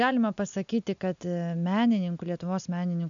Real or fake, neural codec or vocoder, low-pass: real; none; 7.2 kHz